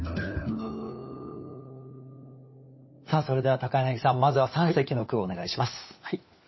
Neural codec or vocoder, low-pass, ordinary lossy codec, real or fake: codec, 16 kHz, 4 kbps, FunCodec, trained on LibriTTS, 50 frames a second; 7.2 kHz; MP3, 24 kbps; fake